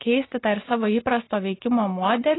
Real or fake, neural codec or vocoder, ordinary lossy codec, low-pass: real; none; AAC, 16 kbps; 7.2 kHz